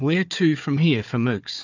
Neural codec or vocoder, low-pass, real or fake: codec, 16 kHz, 4 kbps, FunCodec, trained on Chinese and English, 50 frames a second; 7.2 kHz; fake